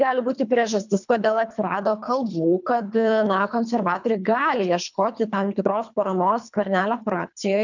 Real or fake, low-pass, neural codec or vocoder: fake; 7.2 kHz; codec, 24 kHz, 3 kbps, HILCodec